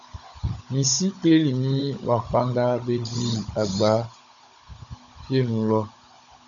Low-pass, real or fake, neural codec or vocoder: 7.2 kHz; fake; codec, 16 kHz, 16 kbps, FunCodec, trained on Chinese and English, 50 frames a second